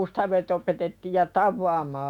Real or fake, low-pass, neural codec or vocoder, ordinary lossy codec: fake; 19.8 kHz; autoencoder, 48 kHz, 128 numbers a frame, DAC-VAE, trained on Japanese speech; none